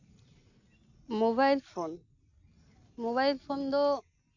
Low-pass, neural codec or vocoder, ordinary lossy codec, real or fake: 7.2 kHz; none; none; real